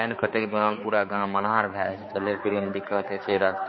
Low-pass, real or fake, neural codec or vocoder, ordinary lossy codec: 7.2 kHz; fake; codec, 16 kHz, 4 kbps, X-Codec, HuBERT features, trained on balanced general audio; MP3, 24 kbps